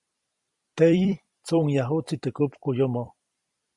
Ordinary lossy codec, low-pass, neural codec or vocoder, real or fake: Opus, 64 kbps; 10.8 kHz; vocoder, 44.1 kHz, 128 mel bands every 256 samples, BigVGAN v2; fake